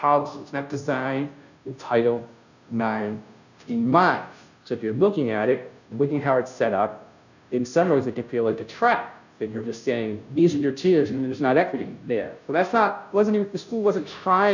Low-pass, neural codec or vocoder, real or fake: 7.2 kHz; codec, 16 kHz, 0.5 kbps, FunCodec, trained on Chinese and English, 25 frames a second; fake